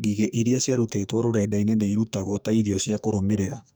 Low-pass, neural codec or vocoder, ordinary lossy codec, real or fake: none; codec, 44.1 kHz, 2.6 kbps, SNAC; none; fake